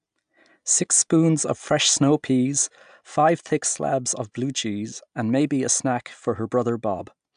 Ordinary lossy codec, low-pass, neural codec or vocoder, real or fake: AAC, 96 kbps; 9.9 kHz; none; real